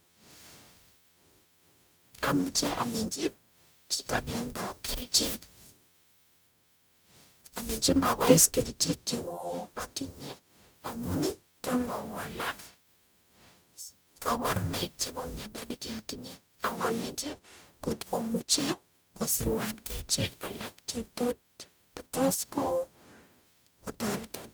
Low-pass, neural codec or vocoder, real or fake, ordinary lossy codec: none; codec, 44.1 kHz, 0.9 kbps, DAC; fake; none